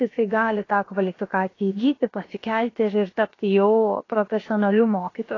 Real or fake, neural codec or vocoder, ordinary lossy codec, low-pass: fake; codec, 16 kHz, about 1 kbps, DyCAST, with the encoder's durations; AAC, 32 kbps; 7.2 kHz